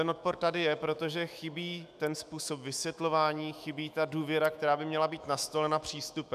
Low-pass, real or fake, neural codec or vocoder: 14.4 kHz; fake; autoencoder, 48 kHz, 128 numbers a frame, DAC-VAE, trained on Japanese speech